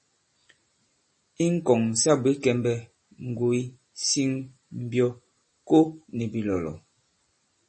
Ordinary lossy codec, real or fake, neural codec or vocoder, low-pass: MP3, 32 kbps; real; none; 10.8 kHz